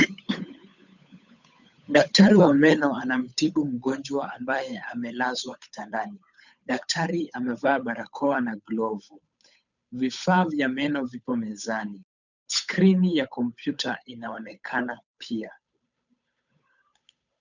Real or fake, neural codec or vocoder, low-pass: fake; codec, 16 kHz, 8 kbps, FunCodec, trained on Chinese and English, 25 frames a second; 7.2 kHz